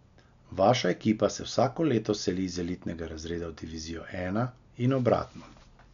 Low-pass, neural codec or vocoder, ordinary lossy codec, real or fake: 7.2 kHz; none; none; real